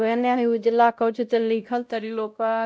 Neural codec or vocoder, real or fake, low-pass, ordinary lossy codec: codec, 16 kHz, 0.5 kbps, X-Codec, WavLM features, trained on Multilingual LibriSpeech; fake; none; none